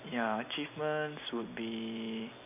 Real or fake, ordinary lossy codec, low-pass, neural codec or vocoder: real; none; 3.6 kHz; none